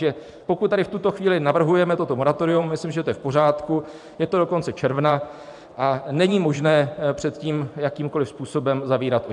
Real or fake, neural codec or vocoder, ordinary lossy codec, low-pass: fake; vocoder, 44.1 kHz, 128 mel bands every 512 samples, BigVGAN v2; MP3, 96 kbps; 10.8 kHz